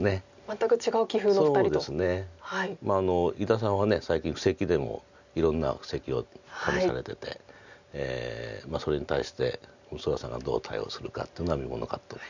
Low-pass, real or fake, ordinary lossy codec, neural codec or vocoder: 7.2 kHz; real; none; none